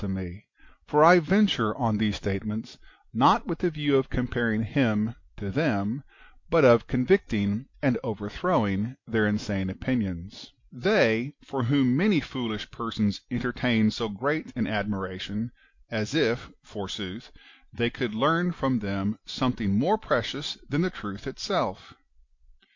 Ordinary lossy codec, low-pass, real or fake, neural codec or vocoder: MP3, 48 kbps; 7.2 kHz; real; none